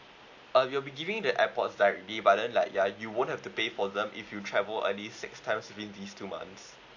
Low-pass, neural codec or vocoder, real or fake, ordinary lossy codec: 7.2 kHz; none; real; AAC, 48 kbps